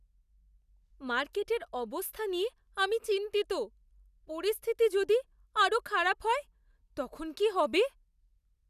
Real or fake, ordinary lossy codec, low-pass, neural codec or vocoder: real; none; 14.4 kHz; none